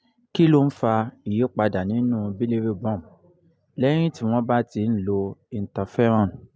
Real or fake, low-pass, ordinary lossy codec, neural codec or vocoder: real; none; none; none